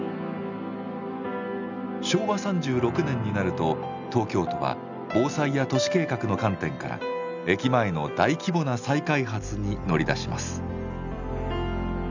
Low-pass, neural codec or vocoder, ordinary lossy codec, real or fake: 7.2 kHz; none; none; real